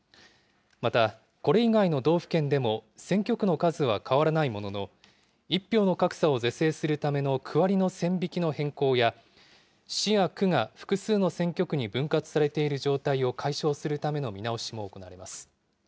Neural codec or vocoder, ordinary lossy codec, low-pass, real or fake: none; none; none; real